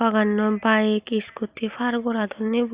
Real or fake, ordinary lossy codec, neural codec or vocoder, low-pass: real; Opus, 64 kbps; none; 3.6 kHz